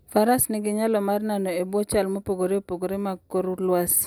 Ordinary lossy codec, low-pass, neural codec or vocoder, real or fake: none; none; none; real